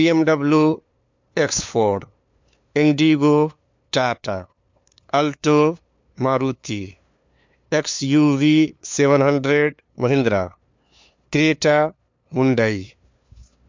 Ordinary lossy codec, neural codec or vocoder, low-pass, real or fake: MP3, 64 kbps; codec, 16 kHz, 2 kbps, FunCodec, trained on LibriTTS, 25 frames a second; 7.2 kHz; fake